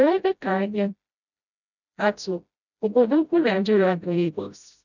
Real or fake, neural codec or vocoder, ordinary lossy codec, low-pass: fake; codec, 16 kHz, 0.5 kbps, FreqCodec, smaller model; none; 7.2 kHz